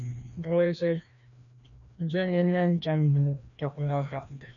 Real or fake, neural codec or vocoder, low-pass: fake; codec, 16 kHz, 1 kbps, FreqCodec, larger model; 7.2 kHz